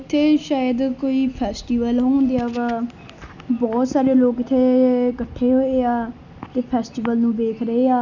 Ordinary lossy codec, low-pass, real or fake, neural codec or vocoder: none; 7.2 kHz; real; none